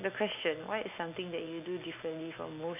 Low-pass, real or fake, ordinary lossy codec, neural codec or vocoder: 3.6 kHz; real; none; none